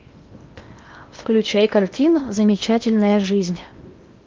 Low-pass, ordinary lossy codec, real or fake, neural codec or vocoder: 7.2 kHz; Opus, 24 kbps; fake; codec, 16 kHz in and 24 kHz out, 0.8 kbps, FocalCodec, streaming, 65536 codes